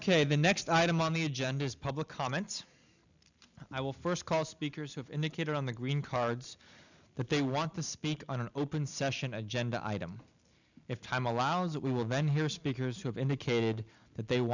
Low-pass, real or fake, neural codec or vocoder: 7.2 kHz; real; none